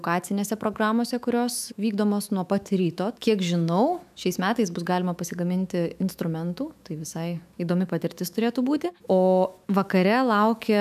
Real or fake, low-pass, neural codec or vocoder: fake; 14.4 kHz; autoencoder, 48 kHz, 128 numbers a frame, DAC-VAE, trained on Japanese speech